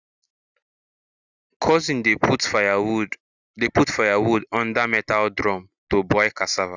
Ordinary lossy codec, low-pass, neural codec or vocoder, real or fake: Opus, 64 kbps; 7.2 kHz; none; real